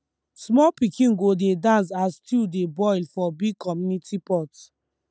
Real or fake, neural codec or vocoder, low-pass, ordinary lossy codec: real; none; none; none